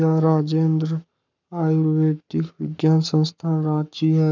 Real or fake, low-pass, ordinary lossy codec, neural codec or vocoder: fake; 7.2 kHz; none; codec, 44.1 kHz, 7.8 kbps, Pupu-Codec